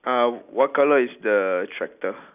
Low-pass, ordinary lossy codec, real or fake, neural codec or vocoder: 3.6 kHz; none; real; none